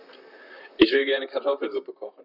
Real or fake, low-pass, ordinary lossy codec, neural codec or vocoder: fake; 5.4 kHz; none; vocoder, 44.1 kHz, 128 mel bands, Pupu-Vocoder